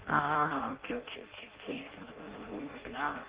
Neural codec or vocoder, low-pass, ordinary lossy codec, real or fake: codec, 16 kHz in and 24 kHz out, 0.6 kbps, FireRedTTS-2 codec; 3.6 kHz; Opus, 16 kbps; fake